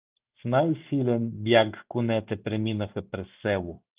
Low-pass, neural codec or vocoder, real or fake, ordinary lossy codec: 3.6 kHz; none; real; Opus, 32 kbps